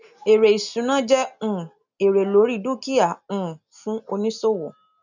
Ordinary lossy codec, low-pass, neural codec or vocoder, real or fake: none; 7.2 kHz; none; real